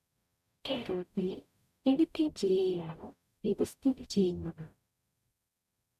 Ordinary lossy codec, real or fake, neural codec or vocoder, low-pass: none; fake; codec, 44.1 kHz, 0.9 kbps, DAC; 14.4 kHz